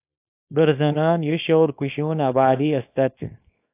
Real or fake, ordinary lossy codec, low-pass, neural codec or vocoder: fake; AAC, 24 kbps; 3.6 kHz; codec, 24 kHz, 0.9 kbps, WavTokenizer, small release